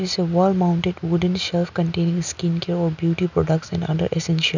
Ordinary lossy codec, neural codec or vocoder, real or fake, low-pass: none; none; real; 7.2 kHz